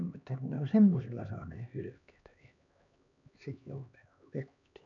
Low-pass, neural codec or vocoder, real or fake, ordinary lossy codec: 7.2 kHz; codec, 16 kHz, 2 kbps, X-Codec, HuBERT features, trained on LibriSpeech; fake; none